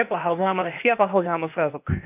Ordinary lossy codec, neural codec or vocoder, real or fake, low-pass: none; codec, 16 kHz, 0.8 kbps, ZipCodec; fake; 3.6 kHz